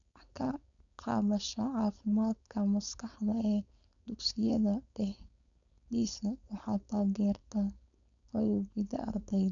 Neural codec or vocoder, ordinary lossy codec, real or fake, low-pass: codec, 16 kHz, 4.8 kbps, FACodec; none; fake; 7.2 kHz